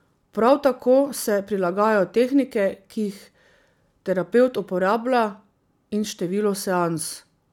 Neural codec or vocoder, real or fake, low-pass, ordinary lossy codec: none; real; 19.8 kHz; none